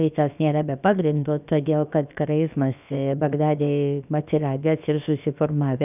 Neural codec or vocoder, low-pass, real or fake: codec, 16 kHz, about 1 kbps, DyCAST, with the encoder's durations; 3.6 kHz; fake